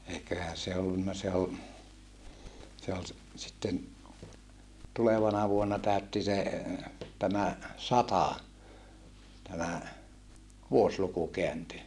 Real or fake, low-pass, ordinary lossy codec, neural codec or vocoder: real; none; none; none